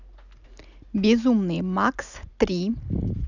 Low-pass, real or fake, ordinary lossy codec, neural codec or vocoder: 7.2 kHz; real; none; none